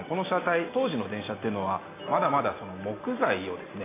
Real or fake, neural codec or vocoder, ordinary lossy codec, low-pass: real; none; AAC, 16 kbps; 3.6 kHz